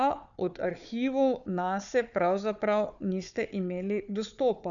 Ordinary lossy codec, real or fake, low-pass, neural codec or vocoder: none; fake; 7.2 kHz; codec, 16 kHz, 4 kbps, FunCodec, trained on Chinese and English, 50 frames a second